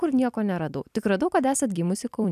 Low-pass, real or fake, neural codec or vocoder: 14.4 kHz; fake; vocoder, 44.1 kHz, 128 mel bands every 256 samples, BigVGAN v2